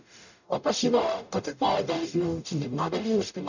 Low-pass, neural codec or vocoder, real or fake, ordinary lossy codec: 7.2 kHz; codec, 44.1 kHz, 0.9 kbps, DAC; fake; none